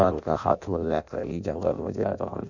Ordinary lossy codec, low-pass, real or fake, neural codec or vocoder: none; 7.2 kHz; fake; codec, 16 kHz in and 24 kHz out, 0.6 kbps, FireRedTTS-2 codec